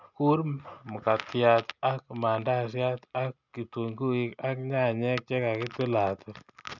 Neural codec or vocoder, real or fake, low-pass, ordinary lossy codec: none; real; 7.2 kHz; none